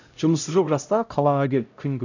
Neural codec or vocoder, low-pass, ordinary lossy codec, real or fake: codec, 16 kHz, 0.5 kbps, X-Codec, HuBERT features, trained on LibriSpeech; 7.2 kHz; none; fake